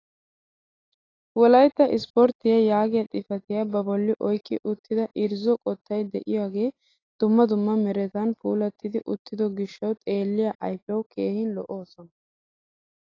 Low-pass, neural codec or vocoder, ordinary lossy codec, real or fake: 7.2 kHz; none; AAC, 32 kbps; real